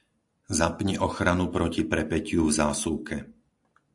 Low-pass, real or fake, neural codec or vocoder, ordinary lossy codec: 10.8 kHz; real; none; AAC, 64 kbps